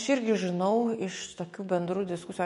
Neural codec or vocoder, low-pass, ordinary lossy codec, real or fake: autoencoder, 48 kHz, 128 numbers a frame, DAC-VAE, trained on Japanese speech; 19.8 kHz; MP3, 48 kbps; fake